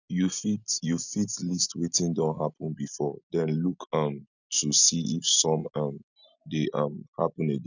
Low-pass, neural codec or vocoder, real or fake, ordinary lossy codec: 7.2 kHz; none; real; none